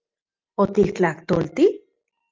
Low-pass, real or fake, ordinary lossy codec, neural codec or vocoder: 7.2 kHz; real; Opus, 32 kbps; none